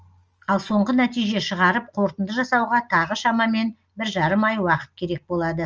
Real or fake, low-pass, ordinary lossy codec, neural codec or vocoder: real; 7.2 kHz; Opus, 24 kbps; none